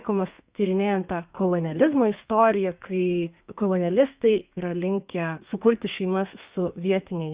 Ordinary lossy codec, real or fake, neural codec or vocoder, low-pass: Opus, 64 kbps; fake; codec, 32 kHz, 1.9 kbps, SNAC; 3.6 kHz